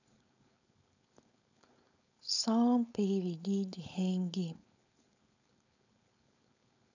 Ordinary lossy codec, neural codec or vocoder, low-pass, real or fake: none; codec, 16 kHz, 4.8 kbps, FACodec; 7.2 kHz; fake